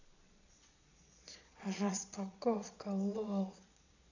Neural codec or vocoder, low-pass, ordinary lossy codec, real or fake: vocoder, 22.05 kHz, 80 mel bands, Vocos; 7.2 kHz; none; fake